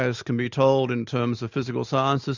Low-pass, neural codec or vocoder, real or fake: 7.2 kHz; none; real